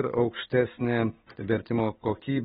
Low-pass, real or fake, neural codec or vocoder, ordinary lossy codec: 7.2 kHz; real; none; AAC, 16 kbps